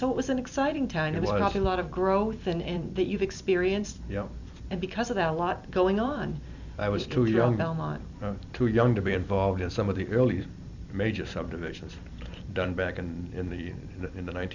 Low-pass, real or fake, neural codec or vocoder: 7.2 kHz; fake; vocoder, 44.1 kHz, 128 mel bands every 256 samples, BigVGAN v2